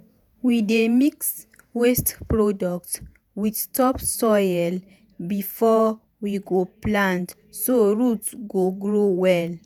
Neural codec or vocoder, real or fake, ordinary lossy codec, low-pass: vocoder, 48 kHz, 128 mel bands, Vocos; fake; none; none